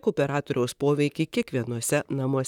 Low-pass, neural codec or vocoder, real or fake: 19.8 kHz; vocoder, 44.1 kHz, 128 mel bands, Pupu-Vocoder; fake